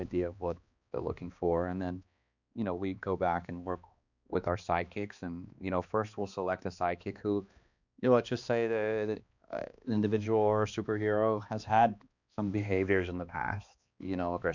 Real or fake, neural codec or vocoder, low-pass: fake; codec, 16 kHz, 2 kbps, X-Codec, HuBERT features, trained on balanced general audio; 7.2 kHz